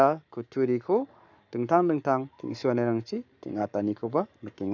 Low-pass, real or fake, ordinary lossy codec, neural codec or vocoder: 7.2 kHz; fake; none; codec, 44.1 kHz, 7.8 kbps, Pupu-Codec